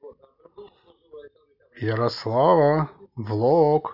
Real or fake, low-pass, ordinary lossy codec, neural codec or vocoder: real; 5.4 kHz; none; none